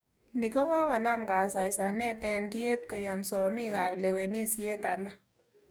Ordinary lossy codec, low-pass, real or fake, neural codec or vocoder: none; none; fake; codec, 44.1 kHz, 2.6 kbps, DAC